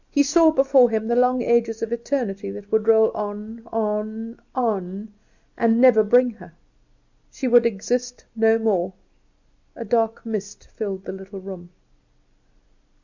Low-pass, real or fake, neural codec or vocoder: 7.2 kHz; real; none